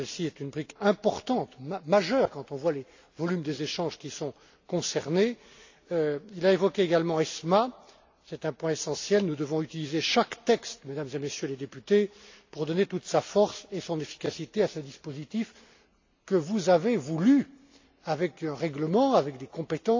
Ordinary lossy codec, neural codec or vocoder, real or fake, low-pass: AAC, 48 kbps; none; real; 7.2 kHz